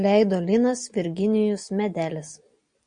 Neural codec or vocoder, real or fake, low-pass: none; real; 9.9 kHz